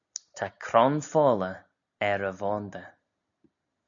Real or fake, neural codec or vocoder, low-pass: real; none; 7.2 kHz